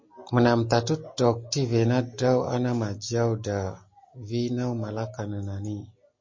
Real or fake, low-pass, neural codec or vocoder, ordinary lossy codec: real; 7.2 kHz; none; MP3, 32 kbps